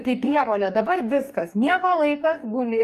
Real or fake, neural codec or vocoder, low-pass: fake; codec, 44.1 kHz, 2.6 kbps, DAC; 14.4 kHz